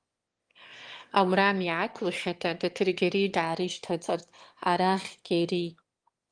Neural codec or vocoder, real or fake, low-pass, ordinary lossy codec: autoencoder, 22.05 kHz, a latent of 192 numbers a frame, VITS, trained on one speaker; fake; 9.9 kHz; Opus, 24 kbps